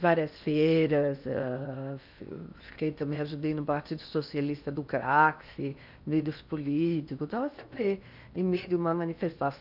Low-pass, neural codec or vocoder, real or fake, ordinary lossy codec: 5.4 kHz; codec, 16 kHz in and 24 kHz out, 0.8 kbps, FocalCodec, streaming, 65536 codes; fake; none